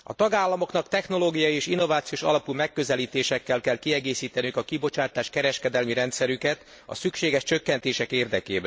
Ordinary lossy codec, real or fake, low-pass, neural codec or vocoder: none; real; none; none